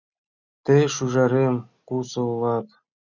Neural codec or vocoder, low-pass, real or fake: none; 7.2 kHz; real